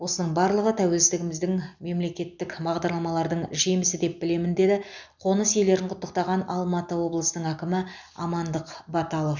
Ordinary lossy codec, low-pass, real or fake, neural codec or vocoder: none; 7.2 kHz; real; none